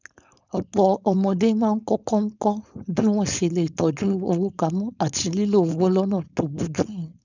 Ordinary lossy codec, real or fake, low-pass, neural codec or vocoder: none; fake; 7.2 kHz; codec, 16 kHz, 4.8 kbps, FACodec